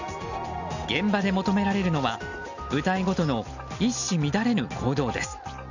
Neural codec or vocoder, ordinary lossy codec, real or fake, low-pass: none; none; real; 7.2 kHz